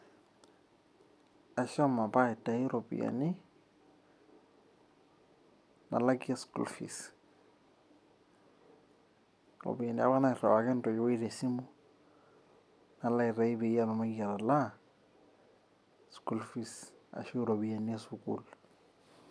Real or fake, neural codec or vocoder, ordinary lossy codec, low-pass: real; none; none; none